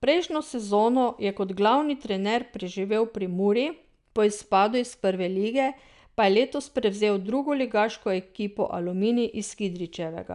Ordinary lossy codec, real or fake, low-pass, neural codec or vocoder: none; real; 10.8 kHz; none